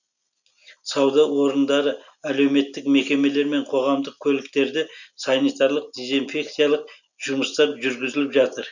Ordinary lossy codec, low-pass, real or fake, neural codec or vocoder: none; 7.2 kHz; real; none